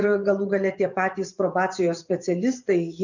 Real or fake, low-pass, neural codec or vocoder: fake; 7.2 kHz; vocoder, 24 kHz, 100 mel bands, Vocos